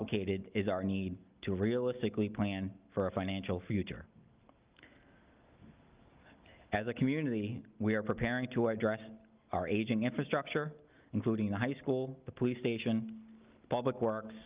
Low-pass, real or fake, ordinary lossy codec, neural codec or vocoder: 3.6 kHz; real; Opus, 32 kbps; none